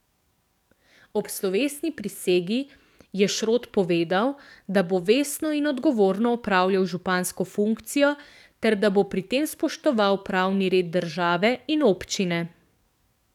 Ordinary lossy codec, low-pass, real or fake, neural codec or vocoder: none; 19.8 kHz; fake; codec, 44.1 kHz, 7.8 kbps, DAC